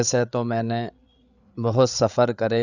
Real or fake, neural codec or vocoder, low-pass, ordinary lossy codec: fake; codec, 16 kHz, 8 kbps, FreqCodec, larger model; 7.2 kHz; none